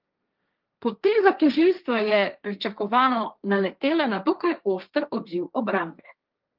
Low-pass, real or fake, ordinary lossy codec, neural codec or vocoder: 5.4 kHz; fake; Opus, 24 kbps; codec, 16 kHz, 1.1 kbps, Voila-Tokenizer